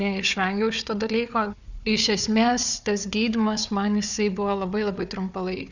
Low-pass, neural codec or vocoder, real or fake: 7.2 kHz; codec, 16 kHz, 4 kbps, FunCodec, trained on Chinese and English, 50 frames a second; fake